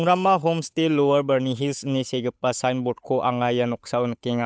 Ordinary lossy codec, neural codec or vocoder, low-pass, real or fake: none; codec, 16 kHz, 8 kbps, FunCodec, trained on Chinese and English, 25 frames a second; none; fake